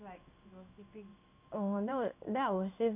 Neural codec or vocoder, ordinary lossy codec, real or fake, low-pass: codec, 16 kHz in and 24 kHz out, 1 kbps, XY-Tokenizer; none; fake; 3.6 kHz